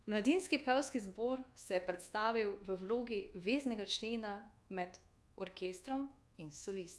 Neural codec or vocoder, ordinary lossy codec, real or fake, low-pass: codec, 24 kHz, 1.2 kbps, DualCodec; none; fake; none